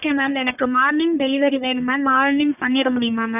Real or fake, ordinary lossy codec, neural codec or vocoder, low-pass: fake; none; codec, 44.1 kHz, 1.7 kbps, Pupu-Codec; 3.6 kHz